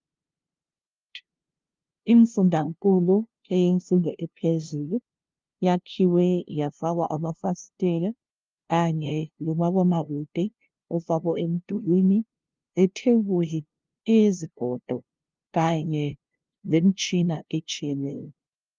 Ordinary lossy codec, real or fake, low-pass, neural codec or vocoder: Opus, 32 kbps; fake; 7.2 kHz; codec, 16 kHz, 0.5 kbps, FunCodec, trained on LibriTTS, 25 frames a second